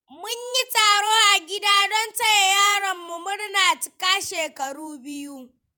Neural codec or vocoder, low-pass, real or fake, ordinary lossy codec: vocoder, 48 kHz, 128 mel bands, Vocos; none; fake; none